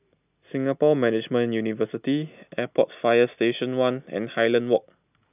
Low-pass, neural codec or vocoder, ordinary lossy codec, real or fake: 3.6 kHz; none; none; real